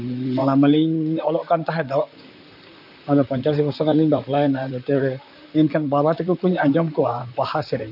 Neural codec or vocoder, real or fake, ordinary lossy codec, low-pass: vocoder, 44.1 kHz, 128 mel bands, Pupu-Vocoder; fake; none; 5.4 kHz